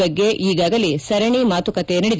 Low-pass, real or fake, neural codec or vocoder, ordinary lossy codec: none; real; none; none